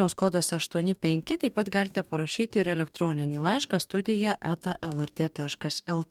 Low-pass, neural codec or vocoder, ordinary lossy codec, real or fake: 19.8 kHz; codec, 44.1 kHz, 2.6 kbps, DAC; MP3, 96 kbps; fake